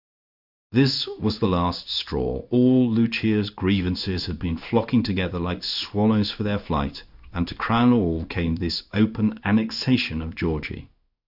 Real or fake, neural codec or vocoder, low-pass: fake; codec, 16 kHz in and 24 kHz out, 1 kbps, XY-Tokenizer; 5.4 kHz